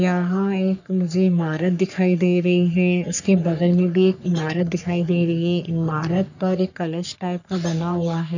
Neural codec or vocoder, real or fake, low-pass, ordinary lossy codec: codec, 44.1 kHz, 3.4 kbps, Pupu-Codec; fake; 7.2 kHz; none